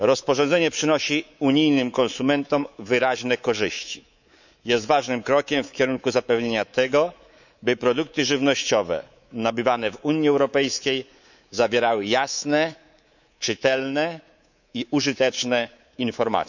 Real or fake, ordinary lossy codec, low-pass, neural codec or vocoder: fake; none; 7.2 kHz; codec, 24 kHz, 3.1 kbps, DualCodec